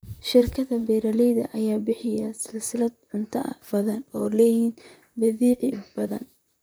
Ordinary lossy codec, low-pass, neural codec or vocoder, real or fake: none; none; vocoder, 44.1 kHz, 128 mel bands, Pupu-Vocoder; fake